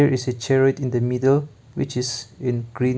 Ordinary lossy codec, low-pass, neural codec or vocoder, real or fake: none; none; none; real